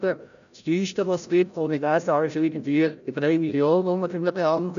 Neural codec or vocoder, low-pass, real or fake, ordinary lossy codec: codec, 16 kHz, 0.5 kbps, FreqCodec, larger model; 7.2 kHz; fake; none